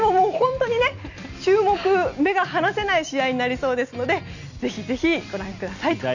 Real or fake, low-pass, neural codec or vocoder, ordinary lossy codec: real; 7.2 kHz; none; none